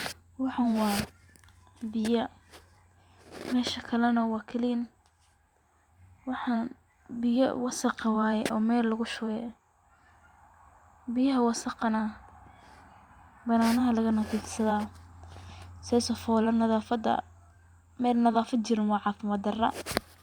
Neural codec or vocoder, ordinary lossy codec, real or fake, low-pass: vocoder, 44.1 kHz, 128 mel bands every 512 samples, BigVGAN v2; Opus, 64 kbps; fake; 19.8 kHz